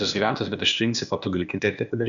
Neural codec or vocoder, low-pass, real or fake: codec, 16 kHz, 0.8 kbps, ZipCodec; 7.2 kHz; fake